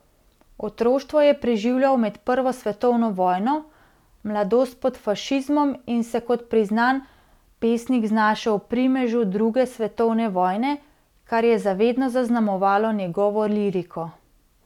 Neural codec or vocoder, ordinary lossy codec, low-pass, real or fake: none; none; 19.8 kHz; real